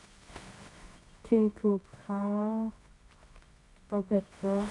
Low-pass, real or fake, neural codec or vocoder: 10.8 kHz; fake; codec, 24 kHz, 0.9 kbps, WavTokenizer, medium music audio release